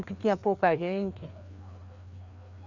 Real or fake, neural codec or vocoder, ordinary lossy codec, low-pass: fake; codec, 16 kHz, 2 kbps, FreqCodec, larger model; none; 7.2 kHz